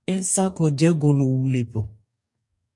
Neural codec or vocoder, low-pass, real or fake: codec, 44.1 kHz, 2.6 kbps, DAC; 10.8 kHz; fake